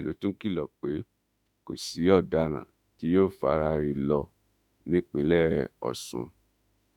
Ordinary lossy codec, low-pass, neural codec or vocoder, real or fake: none; 19.8 kHz; autoencoder, 48 kHz, 32 numbers a frame, DAC-VAE, trained on Japanese speech; fake